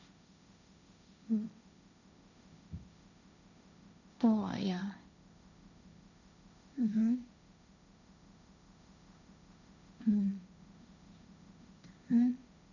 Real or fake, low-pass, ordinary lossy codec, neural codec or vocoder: fake; 7.2 kHz; none; codec, 16 kHz, 1.1 kbps, Voila-Tokenizer